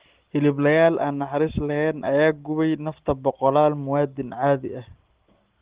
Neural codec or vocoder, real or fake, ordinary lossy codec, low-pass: none; real; Opus, 16 kbps; 3.6 kHz